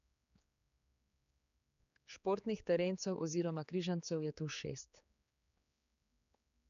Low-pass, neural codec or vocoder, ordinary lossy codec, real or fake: 7.2 kHz; codec, 16 kHz, 4 kbps, X-Codec, HuBERT features, trained on general audio; none; fake